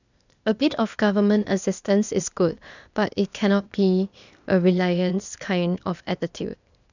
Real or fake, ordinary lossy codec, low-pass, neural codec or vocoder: fake; none; 7.2 kHz; codec, 16 kHz, 0.8 kbps, ZipCodec